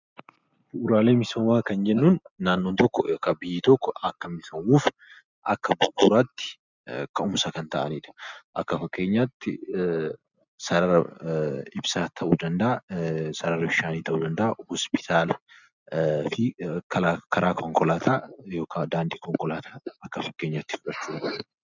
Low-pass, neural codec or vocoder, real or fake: 7.2 kHz; none; real